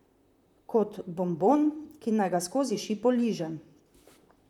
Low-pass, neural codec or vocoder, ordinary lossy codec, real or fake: 19.8 kHz; vocoder, 44.1 kHz, 128 mel bands, Pupu-Vocoder; none; fake